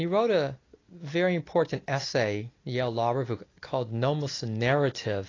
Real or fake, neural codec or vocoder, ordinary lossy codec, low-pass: real; none; AAC, 32 kbps; 7.2 kHz